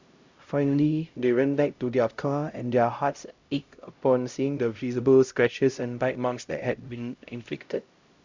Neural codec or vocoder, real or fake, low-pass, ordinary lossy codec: codec, 16 kHz, 0.5 kbps, X-Codec, HuBERT features, trained on LibriSpeech; fake; 7.2 kHz; Opus, 64 kbps